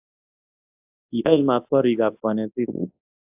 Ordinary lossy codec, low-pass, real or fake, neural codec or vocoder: AAC, 32 kbps; 3.6 kHz; fake; codec, 24 kHz, 0.9 kbps, WavTokenizer, large speech release